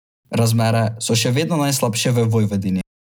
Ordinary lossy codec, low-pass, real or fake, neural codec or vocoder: none; none; real; none